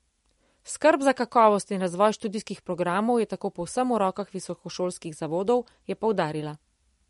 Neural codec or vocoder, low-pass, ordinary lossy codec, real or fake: none; 19.8 kHz; MP3, 48 kbps; real